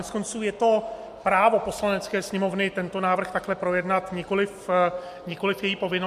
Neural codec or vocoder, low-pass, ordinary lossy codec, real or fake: none; 14.4 kHz; MP3, 64 kbps; real